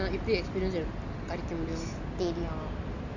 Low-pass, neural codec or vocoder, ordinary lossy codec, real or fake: 7.2 kHz; none; none; real